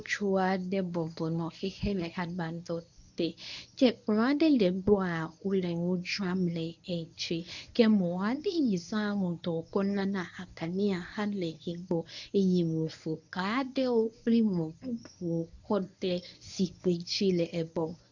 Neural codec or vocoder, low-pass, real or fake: codec, 24 kHz, 0.9 kbps, WavTokenizer, medium speech release version 1; 7.2 kHz; fake